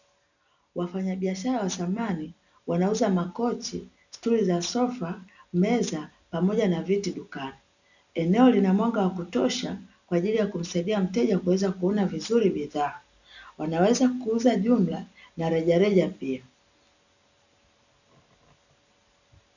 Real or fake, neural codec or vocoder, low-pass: real; none; 7.2 kHz